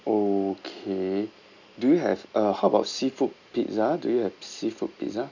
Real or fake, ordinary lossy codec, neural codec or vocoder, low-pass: real; none; none; 7.2 kHz